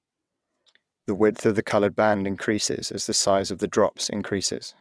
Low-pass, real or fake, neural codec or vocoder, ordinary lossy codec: none; fake; vocoder, 22.05 kHz, 80 mel bands, WaveNeXt; none